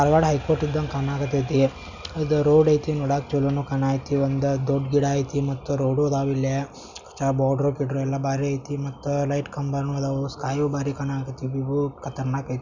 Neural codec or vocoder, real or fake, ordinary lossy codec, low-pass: none; real; none; 7.2 kHz